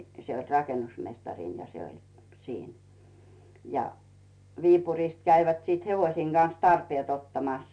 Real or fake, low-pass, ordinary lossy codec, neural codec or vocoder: real; 9.9 kHz; none; none